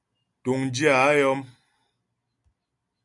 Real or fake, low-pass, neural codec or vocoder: real; 10.8 kHz; none